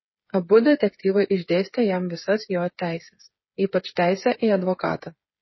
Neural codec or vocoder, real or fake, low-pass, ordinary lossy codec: codec, 16 kHz, 8 kbps, FreqCodec, smaller model; fake; 7.2 kHz; MP3, 24 kbps